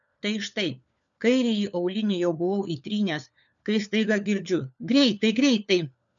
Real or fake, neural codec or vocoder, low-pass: fake; codec, 16 kHz, 4 kbps, FunCodec, trained on LibriTTS, 50 frames a second; 7.2 kHz